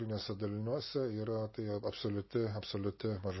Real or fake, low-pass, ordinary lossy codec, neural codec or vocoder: real; 7.2 kHz; MP3, 24 kbps; none